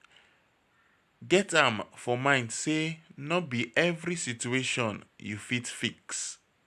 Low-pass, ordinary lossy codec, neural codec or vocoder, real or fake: 14.4 kHz; none; none; real